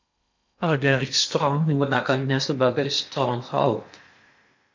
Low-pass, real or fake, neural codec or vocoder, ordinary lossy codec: 7.2 kHz; fake; codec, 16 kHz in and 24 kHz out, 0.8 kbps, FocalCodec, streaming, 65536 codes; MP3, 64 kbps